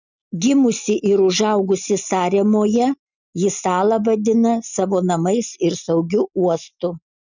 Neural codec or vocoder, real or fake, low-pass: none; real; 7.2 kHz